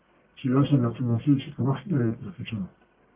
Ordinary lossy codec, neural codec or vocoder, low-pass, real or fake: Opus, 24 kbps; codec, 44.1 kHz, 1.7 kbps, Pupu-Codec; 3.6 kHz; fake